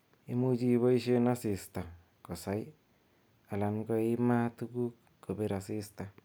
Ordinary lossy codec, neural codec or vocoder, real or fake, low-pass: none; none; real; none